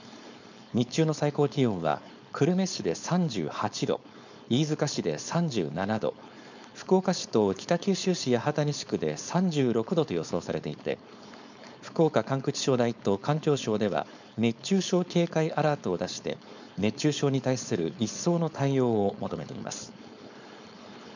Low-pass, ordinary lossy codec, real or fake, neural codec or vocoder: 7.2 kHz; none; fake; codec, 16 kHz, 4.8 kbps, FACodec